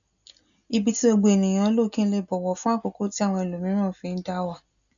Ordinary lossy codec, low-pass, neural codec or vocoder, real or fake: none; 7.2 kHz; none; real